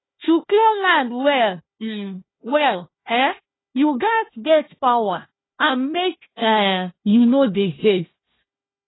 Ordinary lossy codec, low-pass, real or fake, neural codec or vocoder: AAC, 16 kbps; 7.2 kHz; fake; codec, 16 kHz, 1 kbps, FunCodec, trained on Chinese and English, 50 frames a second